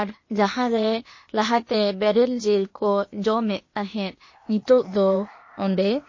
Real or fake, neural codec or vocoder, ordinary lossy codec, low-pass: fake; codec, 16 kHz, 0.8 kbps, ZipCodec; MP3, 32 kbps; 7.2 kHz